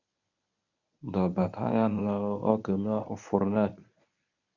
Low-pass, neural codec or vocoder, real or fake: 7.2 kHz; codec, 24 kHz, 0.9 kbps, WavTokenizer, medium speech release version 1; fake